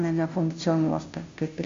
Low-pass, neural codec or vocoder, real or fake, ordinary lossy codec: 7.2 kHz; codec, 16 kHz, 0.5 kbps, FunCodec, trained on Chinese and English, 25 frames a second; fake; MP3, 48 kbps